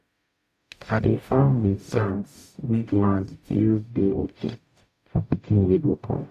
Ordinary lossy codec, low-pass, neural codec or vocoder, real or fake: AAC, 96 kbps; 14.4 kHz; codec, 44.1 kHz, 0.9 kbps, DAC; fake